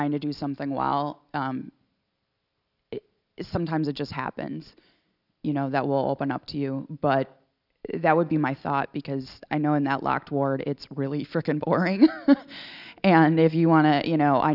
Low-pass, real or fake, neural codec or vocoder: 5.4 kHz; real; none